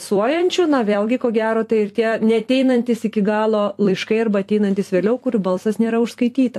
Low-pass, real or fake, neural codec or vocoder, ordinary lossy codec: 14.4 kHz; fake; vocoder, 44.1 kHz, 128 mel bands every 256 samples, BigVGAN v2; AAC, 64 kbps